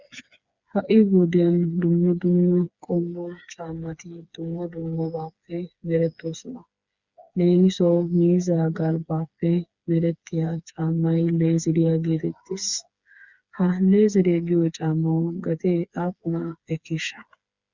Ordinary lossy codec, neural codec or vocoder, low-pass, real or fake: Opus, 64 kbps; codec, 16 kHz, 4 kbps, FreqCodec, smaller model; 7.2 kHz; fake